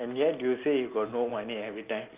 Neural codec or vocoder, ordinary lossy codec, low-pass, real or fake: none; Opus, 24 kbps; 3.6 kHz; real